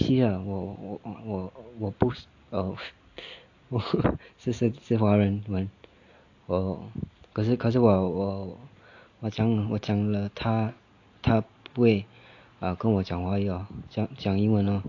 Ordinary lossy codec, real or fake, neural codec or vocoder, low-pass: AAC, 48 kbps; real; none; 7.2 kHz